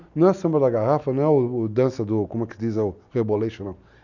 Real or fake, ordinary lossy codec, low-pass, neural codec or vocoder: real; none; 7.2 kHz; none